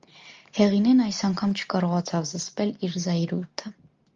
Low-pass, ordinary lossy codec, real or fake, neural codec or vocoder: 7.2 kHz; Opus, 32 kbps; real; none